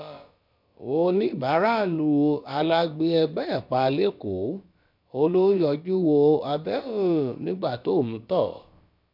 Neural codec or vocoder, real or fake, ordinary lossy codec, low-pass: codec, 16 kHz, about 1 kbps, DyCAST, with the encoder's durations; fake; MP3, 48 kbps; 5.4 kHz